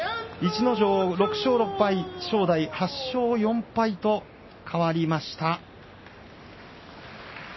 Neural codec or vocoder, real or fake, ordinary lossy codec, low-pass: none; real; MP3, 24 kbps; 7.2 kHz